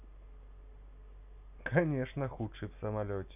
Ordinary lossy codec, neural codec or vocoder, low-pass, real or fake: none; none; 3.6 kHz; real